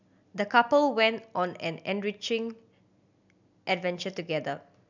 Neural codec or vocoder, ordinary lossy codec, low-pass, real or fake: none; none; 7.2 kHz; real